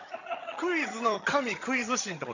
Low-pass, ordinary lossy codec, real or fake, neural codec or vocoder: 7.2 kHz; none; fake; vocoder, 22.05 kHz, 80 mel bands, HiFi-GAN